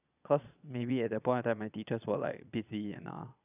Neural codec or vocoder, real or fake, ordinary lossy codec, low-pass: vocoder, 22.05 kHz, 80 mel bands, Vocos; fake; none; 3.6 kHz